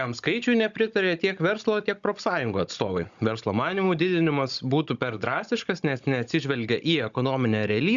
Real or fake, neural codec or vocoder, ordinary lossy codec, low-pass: fake; codec, 16 kHz, 16 kbps, FunCodec, trained on Chinese and English, 50 frames a second; Opus, 64 kbps; 7.2 kHz